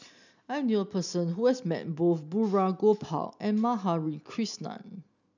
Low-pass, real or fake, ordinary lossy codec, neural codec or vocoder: 7.2 kHz; real; none; none